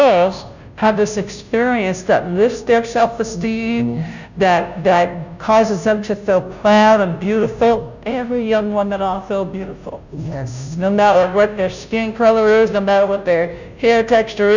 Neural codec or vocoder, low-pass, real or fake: codec, 16 kHz, 0.5 kbps, FunCodec, trained on Chinese and English, 25 frames a second; 7.2 kHz; fake